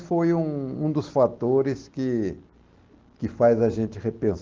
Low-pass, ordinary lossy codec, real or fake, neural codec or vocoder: 7.2 kHz; Opus, 16 kbps; real; none